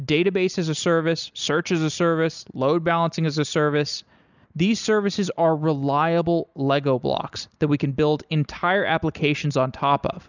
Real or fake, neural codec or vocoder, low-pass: real; none; 7.2 kHz